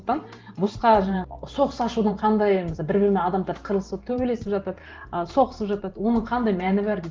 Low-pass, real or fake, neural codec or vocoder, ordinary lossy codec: 7.2 kHz; fake; vocoder, 44.1 kHz, 128 mel bands every 512 samples, BigVGAN v2; Opus, 16 kbps